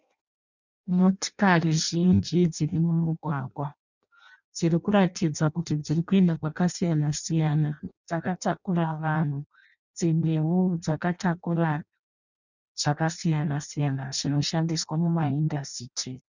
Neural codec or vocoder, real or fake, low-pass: codec, 16 kHz in and 24 kHz out, 0.6 kbps, FireRedTTS-2 codec; fake; 7.2 kHz